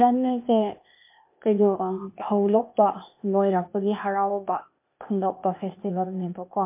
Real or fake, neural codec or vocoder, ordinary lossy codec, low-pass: fake; codec, 16 kHz, 0.8 kbps, ZipCodec; MP3, 32 kbps; 3.6 kHz